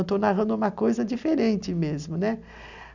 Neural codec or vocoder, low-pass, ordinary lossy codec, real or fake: none; 7.2 kHz; none; real